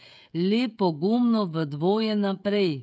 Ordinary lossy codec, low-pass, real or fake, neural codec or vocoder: none; none; fake; codec, 16 kHz, 16 kbps, FreqCodec, smaller model